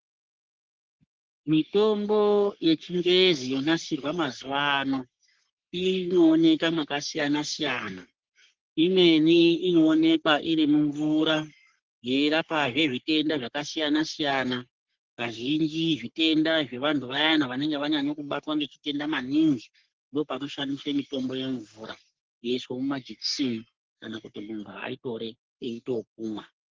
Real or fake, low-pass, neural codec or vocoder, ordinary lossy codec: fake; 7.2 kHz; codec, 44.1 kHz, 3.4 kbps, Pupu-Codec; Opus, 16 kbps